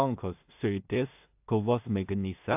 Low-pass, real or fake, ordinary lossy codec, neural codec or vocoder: 3.6 kHz; fake; AAC, 32 kbps; codec, 16 kHz in and 24 kHz out, 0.4 kbps, LongCat-Audio-Codec, two codebook decoder